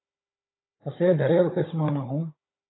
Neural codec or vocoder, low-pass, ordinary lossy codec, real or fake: codec, 16 kHz, 16 kbps, FunCodec, trained on Chinese and English, 50 frames a second; 7.2 kHz; AAC, 16 kbps; fake